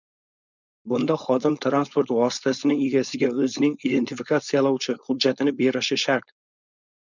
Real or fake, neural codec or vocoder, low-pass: fake; codec, 16 kHz, 4.8 kbps, FACodec; 7.2 kHz